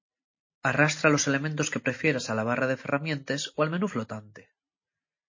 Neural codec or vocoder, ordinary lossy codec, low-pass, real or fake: none; MP3, 32 kbps; 7.2 kHz; real